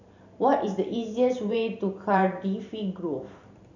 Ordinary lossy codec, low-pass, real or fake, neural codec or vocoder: none; 7.2 kHz; fake; vocoder, 44.1 kHz, 128 mel bands every 512 samples, BigVGAN v2